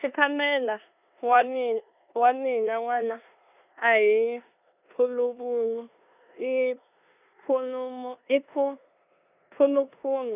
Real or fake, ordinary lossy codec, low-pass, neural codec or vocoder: fake; none; 3.6 kHz; codec, 16 kHz in and 24 kHz out, 0.9 kbps, LongCat-Audio-Codec, four codebook decoder